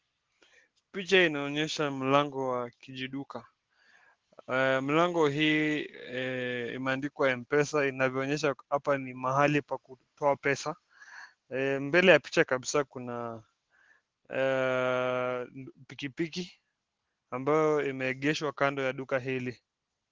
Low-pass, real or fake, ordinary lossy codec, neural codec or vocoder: 7.2 kHz; real; Opus, 16 kbps; none